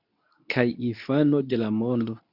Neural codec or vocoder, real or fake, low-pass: codec, 24 kHz, 0.9 kbps, WavTokenizer, medium speech release version 2; fake; 5.4 kHz